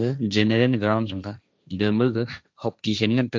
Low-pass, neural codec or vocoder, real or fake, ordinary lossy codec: none; codec, 16 kHz, 1.1 kbps, Voila-Tokenizer; fake; none